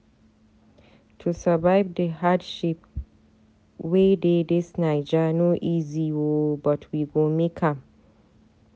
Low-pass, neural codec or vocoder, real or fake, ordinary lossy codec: none; none; real; none